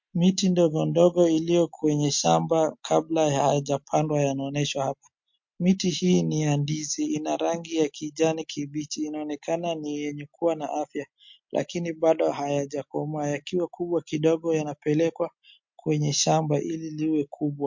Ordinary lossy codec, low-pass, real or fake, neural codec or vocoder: MP3, 48 kbps; 7.2 kHz; real; none